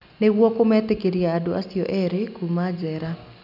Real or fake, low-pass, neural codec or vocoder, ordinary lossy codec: real; 5.4 kHz; none; none